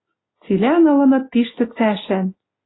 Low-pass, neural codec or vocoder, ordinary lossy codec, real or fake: 7.2 kHz; none; AAC, 16 kbps; real